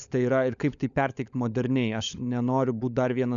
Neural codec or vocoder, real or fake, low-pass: codec, 16 kHz, 16 kbps, FunCodec, trained on LibriTTS, 50 frames a second; fake; 7.2 kHz